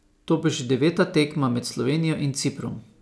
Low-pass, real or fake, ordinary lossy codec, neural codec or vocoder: none; real; none; none